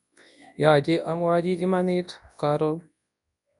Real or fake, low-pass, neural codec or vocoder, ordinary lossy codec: fake; 10.8 kHz; codec, 24 kHz, 0.9 kbps, WavTokenizer, large speech release; none